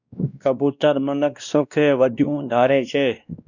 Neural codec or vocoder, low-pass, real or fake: codec, 16 kHz, 1 kbps, X-Codec, WavLM features, trained on Multilingual LibriSpeech; 7.2 kHz; fake